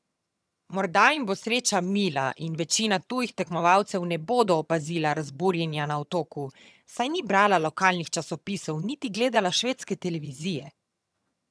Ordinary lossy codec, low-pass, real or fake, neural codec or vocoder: none; none; fake; vocoder, 22.05 kHz, 80 mel bands, HiFi-GAN